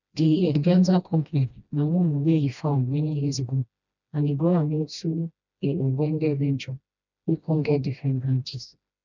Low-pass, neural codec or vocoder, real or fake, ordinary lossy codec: 7.2 kHz; codec, 16 kHz, 1 kbps, FreqCodec, smaller model; fake; none